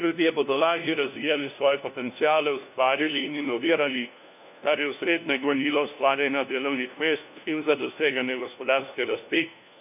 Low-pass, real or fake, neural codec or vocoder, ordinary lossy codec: 3.6 kHz; fake; codec, 16 kHz, 1 kbps, FunCodec, trained on LibriTTS, 50 frames a second; none